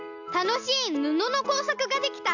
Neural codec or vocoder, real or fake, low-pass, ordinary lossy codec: none; real; 7.2 kHz; none